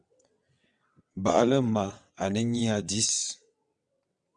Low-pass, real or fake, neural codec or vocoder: 9.9 kHz; fake; vocoder, 22.05 kHz, 80 mel bands, WaveNeXt